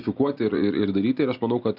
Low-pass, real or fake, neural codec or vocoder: 5.4 kHz; real; none